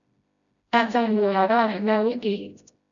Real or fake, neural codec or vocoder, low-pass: fake; codec, 16 kHz, 0.5 kbps, FreqCodec, smaller model; 7.2 kHz